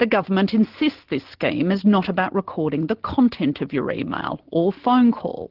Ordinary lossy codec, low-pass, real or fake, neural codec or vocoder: Opus, 16 kbps; 5.4 kHz; real; none